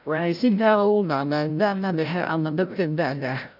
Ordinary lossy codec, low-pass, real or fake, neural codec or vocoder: none; 5.4 kHz; fake; codec, 16 kHz, 0.5 kbps, FreqCodec, larger model